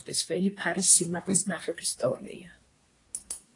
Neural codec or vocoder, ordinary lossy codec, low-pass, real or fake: codec, 24 kHz, 1 kbps, SNAC; AAC, 48 kbps; 10.8 kHz; fake